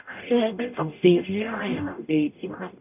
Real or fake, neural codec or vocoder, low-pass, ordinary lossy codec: fake; codec, 44.1 kHz, 0.9 kbps, DAC; 3.6 kHz; none